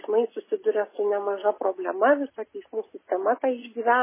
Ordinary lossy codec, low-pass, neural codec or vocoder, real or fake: MP3, 16 kbps; 3.6 kHz; none; real